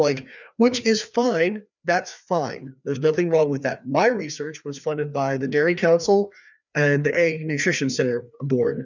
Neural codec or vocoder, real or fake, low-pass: codec, 16 kHz, 2 kbps, FreqCodec, larger model; fake; 7.2 kHz